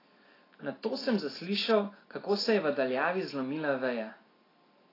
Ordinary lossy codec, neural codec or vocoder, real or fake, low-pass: AAC, 24 kbps; none; real; 5.4 kHz